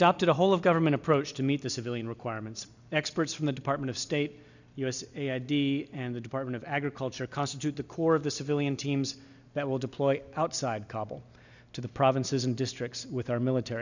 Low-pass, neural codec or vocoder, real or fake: 7.2 kHz; none; real